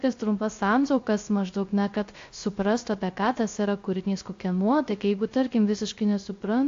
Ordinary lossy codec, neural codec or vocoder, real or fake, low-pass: AAC, 48 kbps; codec, 16 kHz, 0.3 kbps, FocalCodec; fake; 7.2 kHz